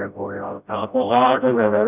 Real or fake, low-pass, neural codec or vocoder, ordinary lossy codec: fake; 3.6 kHz; codec, 16 kHz, 0.5 kbps, FreqCodec, smaller model; none